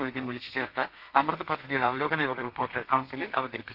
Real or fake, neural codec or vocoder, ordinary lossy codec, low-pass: fake; codec, 32 kHz, 1.9 kbps, SNAC; none; 5.4 kHz